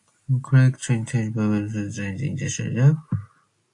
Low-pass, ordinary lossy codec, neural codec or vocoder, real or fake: 10.8 kHz; AAC, 48 kbps; none; real